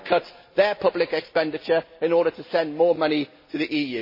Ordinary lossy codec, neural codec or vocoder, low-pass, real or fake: MP3, 24 kbps; none; 5.4 kHz; real